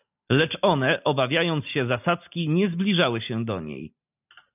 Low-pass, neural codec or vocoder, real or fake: 3.6 kHz; none; real